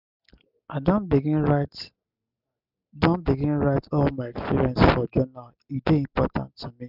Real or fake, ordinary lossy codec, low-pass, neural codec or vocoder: real; none; 5.4 kHz; none